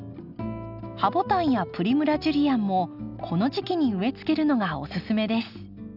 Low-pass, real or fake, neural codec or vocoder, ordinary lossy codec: 5.4 kHz; real; none; none